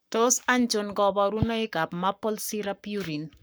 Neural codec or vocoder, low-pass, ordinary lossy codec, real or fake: codec, 44.1 kHz, 7.8 kbps, Pupu-Codec; none; none; fake